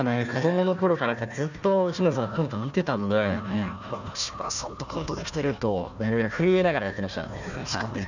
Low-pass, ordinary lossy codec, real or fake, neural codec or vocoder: 7.2 kHz; none; fake; codec, 16 kHz, 1 kbps, FunCodec, trained on Chinese and English, 50 frames a second